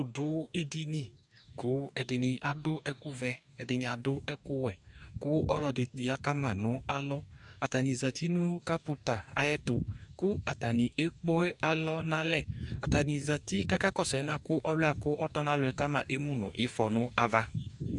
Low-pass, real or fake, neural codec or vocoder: 10.8 kHz; fake; codec, 44.1 kHz, 2.6 kbps, DAC